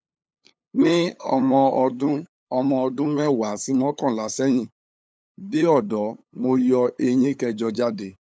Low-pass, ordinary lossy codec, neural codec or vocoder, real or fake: none; none; codec, 16 kHz, 8 kbps, FunCodec, trained on LibriTTS, 25 frames a second; fake